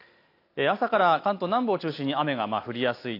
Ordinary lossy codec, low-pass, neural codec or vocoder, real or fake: AAC, 32 kbps; 5.4 kHz; none; real